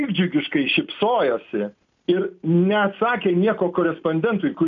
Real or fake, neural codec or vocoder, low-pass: real; none; 7.2 kHz